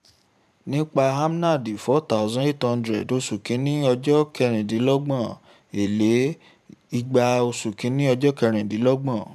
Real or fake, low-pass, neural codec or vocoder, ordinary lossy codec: fake; 14.4 kHz; vocoder, 44.1 kHz, 128 mel bands every 512 samples, BigVGAN v2; none